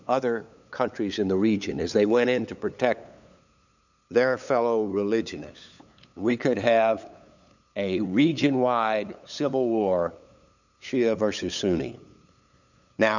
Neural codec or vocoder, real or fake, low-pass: codec, 16 kHz, 8 kbps, FunCodec, trained on LibriTTS, 25 frames a second; fake; 7.2 kHz